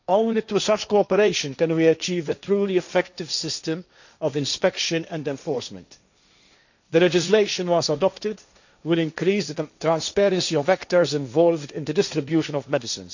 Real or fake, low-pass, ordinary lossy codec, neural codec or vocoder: fake; 7.2 kHz; none; codec, 16 kHz, 1.1 kbps, Voila-Tokenizer